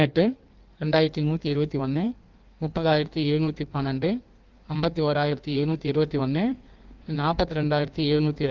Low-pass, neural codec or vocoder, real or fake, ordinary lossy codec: 7.2 kHz; codec, 16 kHz in and 24 kHz out, 1.1 kbps, FireRedTTS-2 codec; fake; Opus, 32 kbps